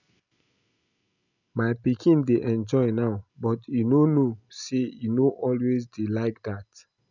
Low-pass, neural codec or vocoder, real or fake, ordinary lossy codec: 7.2 kHz; none; real; none